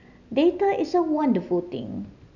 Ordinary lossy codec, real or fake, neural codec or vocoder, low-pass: none; real; none; 7.2 kHz